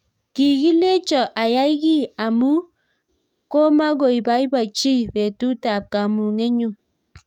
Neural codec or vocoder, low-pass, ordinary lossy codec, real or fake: codec, 44.1 kHz, 7.8 kbps, DAC; 19.8 kHz; none; fake